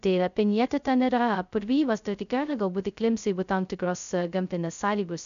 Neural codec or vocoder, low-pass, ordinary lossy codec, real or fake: codec, 16 kHz, 0.2 kbps, FocalCodec; 7.2 kHz; MP3, 96 kbps; fake